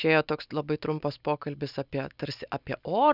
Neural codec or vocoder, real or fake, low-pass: none; real; 5.4 kHz